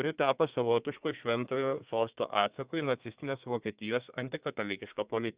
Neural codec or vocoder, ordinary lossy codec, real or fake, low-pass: codec, 32 kHz, 1.9 kbps, SNAC; Opus, 64 kbps; fake; 3.6 kHz